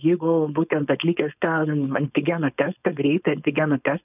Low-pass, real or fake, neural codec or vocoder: 3.6 kHz; fake; codec, 16 kHz, 4.8 kbps, FACodec